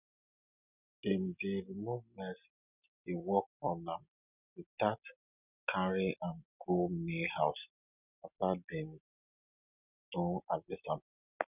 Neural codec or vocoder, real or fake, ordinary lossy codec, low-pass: none; real; none; 3.6 kHz